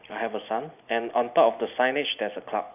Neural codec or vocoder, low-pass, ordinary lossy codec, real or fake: none; 3.6 kHz; none; real